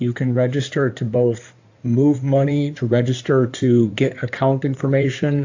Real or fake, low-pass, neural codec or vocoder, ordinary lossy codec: fake; 7.2 kHz; codec, 16 kHz in and 24 kHz out, 2.2 kbps, FireRedTTS-2 codec; AAC, 48 kbps